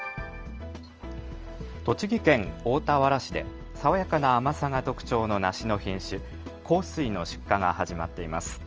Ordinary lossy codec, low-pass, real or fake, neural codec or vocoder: Opus, 24 kbps; 7.2 kHz; real; none